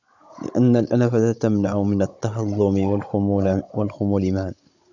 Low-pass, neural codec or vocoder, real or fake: 7.2 kHz; codec, 16 kHz, 16 kbps, FunCodec, trained on Chinese and English, 50 frames a second; fake